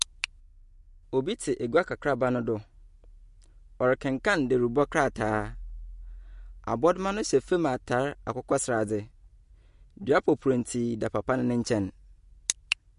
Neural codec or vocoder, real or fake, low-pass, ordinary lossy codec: none; real; 10.8 kHz; MP3, 48 kbps